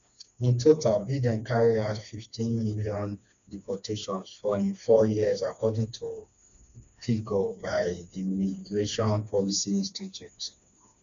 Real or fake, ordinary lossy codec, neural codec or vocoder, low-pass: fake; none; codec, 16 kHz, 2 kbps, FreqCodec, smaller model; 7.2 kHz